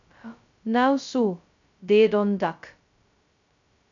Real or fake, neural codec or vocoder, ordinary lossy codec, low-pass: fake; codec, 16 kHz, 0.2 kbps, FocalCodec; MP3, 96 kbps; 7.2 kHz